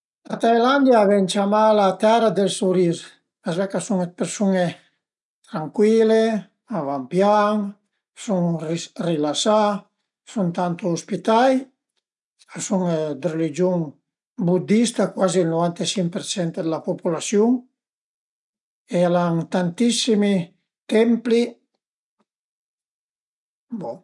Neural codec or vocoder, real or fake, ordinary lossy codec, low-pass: none; real; none; 10.8 kHz